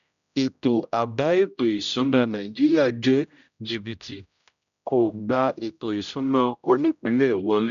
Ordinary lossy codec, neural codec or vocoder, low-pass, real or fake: none; codec, 16 kHz, 0.5 kbps, X-Codec, HuBERT features, trained on general audio; 7.2 kHz; fake